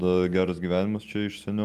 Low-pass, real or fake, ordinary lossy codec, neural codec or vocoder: 14.4 kHz; real; Opus, 32 kbps; none